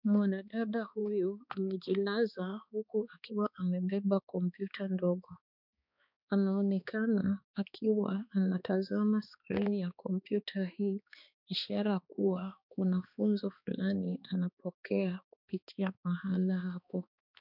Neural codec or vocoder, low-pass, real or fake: codec, 16 kHz, 2 kbps, X-Codec, HuBERT features, trained on balanced general audio; 5.4 kHz; fake